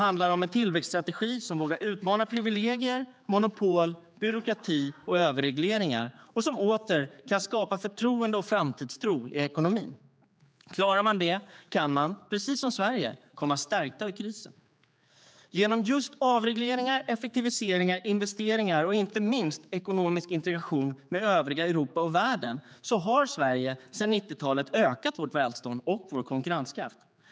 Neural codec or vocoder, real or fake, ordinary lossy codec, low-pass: codec, 16 kHz, 4 kbps, X-Codec, HuBERT features, trained on general audio; fake; none; none